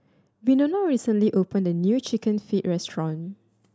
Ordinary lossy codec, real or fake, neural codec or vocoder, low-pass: none; real; none; none